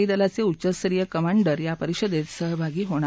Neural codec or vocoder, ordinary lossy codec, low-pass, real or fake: none; none; none; real